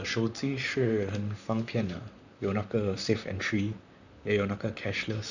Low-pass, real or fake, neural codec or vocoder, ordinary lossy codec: 7.2 kHz; fake; vocoder, 44.1 kHz, 128 mel bands, Pupu-Vocoder; none